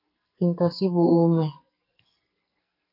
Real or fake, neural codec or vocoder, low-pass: fake; codec, 44.1 kHz, 2.6 kbps, SNAC; 5.4 kHz